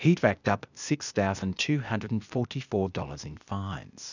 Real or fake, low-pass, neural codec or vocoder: fake; 7.2 kHz; codec, 16 kHz, 0.8 kbps, ZipCodec